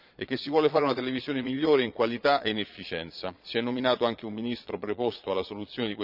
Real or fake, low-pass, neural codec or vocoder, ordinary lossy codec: fake; 5.4 kHz; vocoder, 22.05 kHz, 80 mel bands, Vocos; none